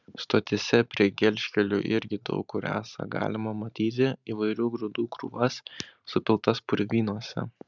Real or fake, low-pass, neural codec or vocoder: fake; 7.2 kHz; vocoder, 44.1 kHz, 128 mel bands every 512 samples, BigVGAN v2